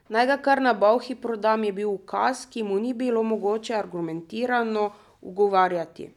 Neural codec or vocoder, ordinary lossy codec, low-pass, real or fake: none; none; 19.8 kHz; real